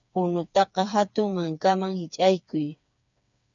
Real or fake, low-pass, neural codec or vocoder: fake; 7.2 kHz; codec, 16 kHz, 4 kbps, FreqCodec, smaller model